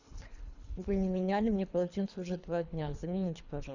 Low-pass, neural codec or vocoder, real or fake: 7.2 kHz; codec, 24 kHz, 3 kbps, HILCodec; fake